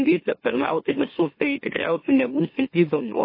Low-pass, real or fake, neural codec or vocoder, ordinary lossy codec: 5.4 kHz; fake; autoencoder, 44.1 kHz, a latent of 192 numbers a frame, MeloTTS; MP3, 24 kbps